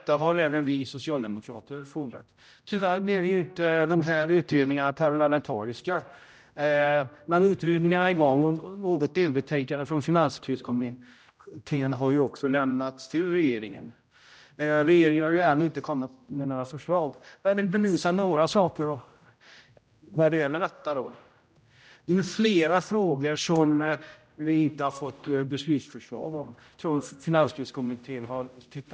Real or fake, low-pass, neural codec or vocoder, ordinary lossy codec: fake; none; codec, 16 kHz, 0.5 kbps, X-Codec, HuBERT features, trained on general audio; none